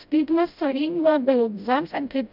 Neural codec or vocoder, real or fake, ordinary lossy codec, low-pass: codec, 16 kHz, 0.5 kbps, FreqCodec, smaller model; fake; none; 5.4 kHz